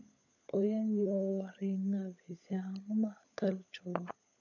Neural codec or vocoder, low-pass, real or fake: codec, 16 kHz, 16 kbps, FunCodec, trained on LibriTTS, 50 frames a second; 7.2 kHz; fake